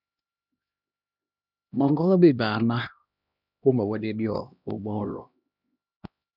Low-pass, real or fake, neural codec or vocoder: 5.4 kHz; fake; codec, 16 kHz, 1 kbps, X-Codec, HuBERT features, trained on LibriSpeech